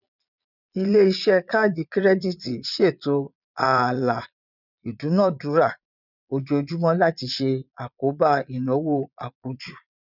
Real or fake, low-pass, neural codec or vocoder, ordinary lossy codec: fake; 5.4 kHz; vocoder, 22.05 kHz, 80 mel bands, Vocos; none